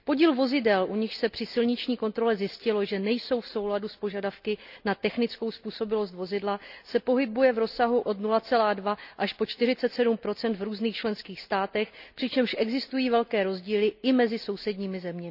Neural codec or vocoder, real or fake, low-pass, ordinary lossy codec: none; real; 5.4 kHz; none